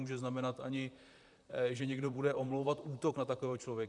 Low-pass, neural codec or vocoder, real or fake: 10.8 kHz; vocoder, 44.1 kHz, 128 mel bands, Pupu-Vocoder; fake